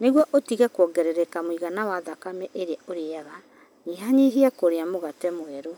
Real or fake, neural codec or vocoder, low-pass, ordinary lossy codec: real; none; none; none